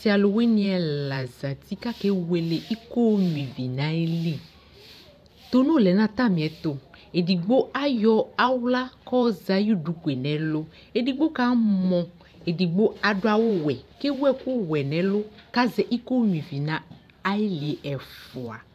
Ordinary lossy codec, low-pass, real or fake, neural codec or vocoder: MP3, 96 kbps; 14.4 kHz; fake; vocoder, 44.1 kHz, 128 mel bands every 512 samples, BigVGAN v2